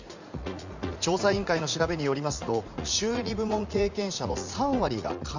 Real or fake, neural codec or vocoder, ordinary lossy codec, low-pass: fake; vocoder, 44.1 kHz, 80 mel bands, Vocos; none; 7.2 kHz